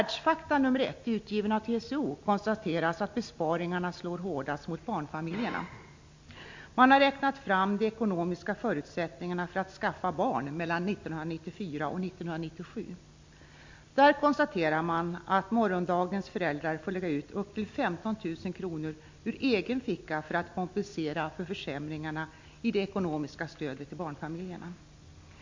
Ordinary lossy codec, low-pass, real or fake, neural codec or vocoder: MP3, 48 kbps; 7.2 kHz; real; none